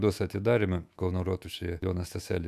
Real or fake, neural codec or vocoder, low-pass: fake; autoencoder, 48 kHz, 128 numbers a frame, DAC-VAE, trained on Japanese speech; 14.4 kHz